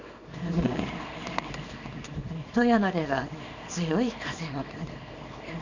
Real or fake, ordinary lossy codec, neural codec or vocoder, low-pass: fake; none; codec, 24 kHz, 0.9 kbps, WavTokenizer, small release; 7.2 kHz